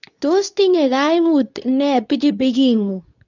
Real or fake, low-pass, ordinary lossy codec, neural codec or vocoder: fake; 7.2 kHz; none; codec, 24 kHz, 0.9 kbps, WavTokenizer, medium speech release version 2